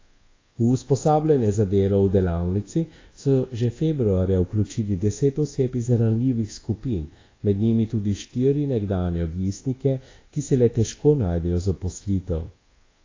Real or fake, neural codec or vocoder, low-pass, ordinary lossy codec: fake; codec, 24 kHz, 1.2 kbps, DualCodec; 7.2 kHz; AAC, 32 kbps